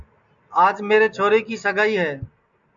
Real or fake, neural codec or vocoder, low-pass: real; none; 7.2 kHz